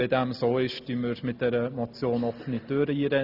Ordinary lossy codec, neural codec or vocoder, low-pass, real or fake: Opus, 64 kbps; none; 5.4 kHz; real